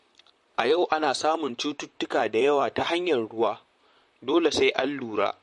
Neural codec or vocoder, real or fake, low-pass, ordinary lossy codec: vocoder, 44.1 kHz, 128 mel bands, Pupu-Vocoder; fake; 14.4 kHz; MP3, 48 kbps